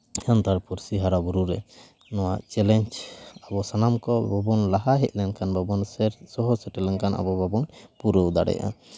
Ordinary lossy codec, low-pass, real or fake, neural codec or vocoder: none; none; real; none